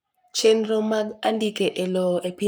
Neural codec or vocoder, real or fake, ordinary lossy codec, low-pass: codec, 44.1 kHz, 7.8 kbps, Pupu-Codec; fake; none; none